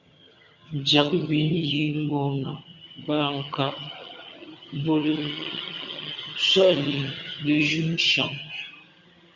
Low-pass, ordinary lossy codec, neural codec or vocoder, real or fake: 7.2 kHz; Opus, 64 kbps; vocoder, 22.05 kHz, 80 mel bands, HiFi-GAN; fake